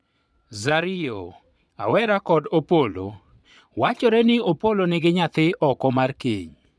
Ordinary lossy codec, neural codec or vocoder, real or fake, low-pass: none; vocoder, 22.05 kHz, 80 mel bands, Vocos; fake; none